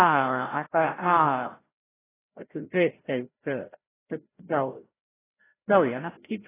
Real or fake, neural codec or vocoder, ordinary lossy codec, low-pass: fake; codec, 16 kHz, 0.5 kbps, FreqCodec, larger model; AAC, 16 kbps; 3.6 kHz